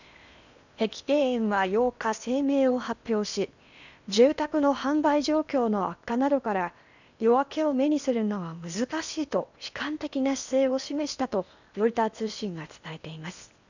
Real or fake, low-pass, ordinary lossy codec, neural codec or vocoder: fake; 7.2 kHz; none; codec, 16 kHz in and 24 kHz out, 0.8 kbps, FocalCodec, streaming, 65536 codes